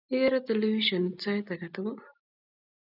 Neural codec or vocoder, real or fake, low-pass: none; real; 5.4 kHz